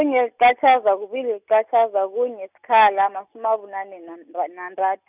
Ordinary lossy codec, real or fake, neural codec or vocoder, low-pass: none; real; none; 3.6 kHz